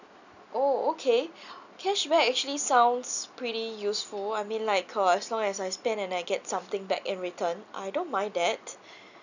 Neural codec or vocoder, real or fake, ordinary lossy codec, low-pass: none; real; none; 7.2 kHz